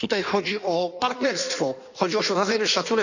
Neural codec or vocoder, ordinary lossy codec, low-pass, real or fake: codec, 16 kHz in and 24 kHz out, 1.1 kbps, FireRedTTS-2 codec; none; 7.2 kHz; fake